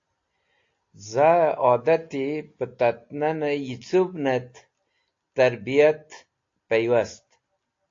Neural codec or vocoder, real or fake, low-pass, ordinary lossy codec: none; real; 7.2 kHz; AAC, 48 kbps